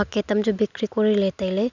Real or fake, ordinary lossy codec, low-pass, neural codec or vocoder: real; none; 7.2 kHz; none